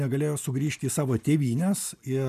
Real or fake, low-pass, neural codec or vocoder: real; 14.4 kHz; none